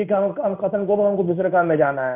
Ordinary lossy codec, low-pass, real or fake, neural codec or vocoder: none; 3.6 kHz; fake; codec, 16 kHz in and 24 kHz out, 1 kbps, XY-Tokenizer